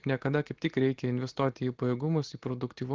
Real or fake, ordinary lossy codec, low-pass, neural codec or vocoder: real; Opus, 16 kbps; 7.2 kHz; none